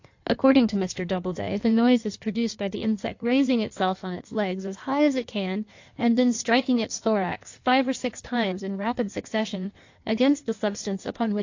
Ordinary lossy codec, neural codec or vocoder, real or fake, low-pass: AAC, 48 kbps; codec, 16 kHz in and 24 kHz out, 1.1 kbps, FireRedTTS-2 codec; fake; 7.2 kHz